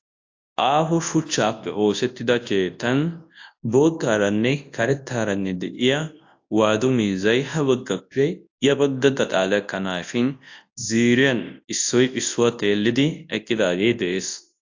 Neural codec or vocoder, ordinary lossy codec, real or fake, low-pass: codec, 24 kHz, 0.9 kbps, WavTokenizer, large speech release; AAC, 48 kbps; fake; 7.2 kHz